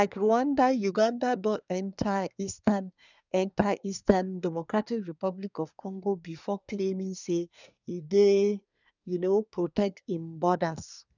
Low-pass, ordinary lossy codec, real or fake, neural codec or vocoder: 7.2 kHz; none; fake; codec, 24 kHz, 1 kbps, SNAC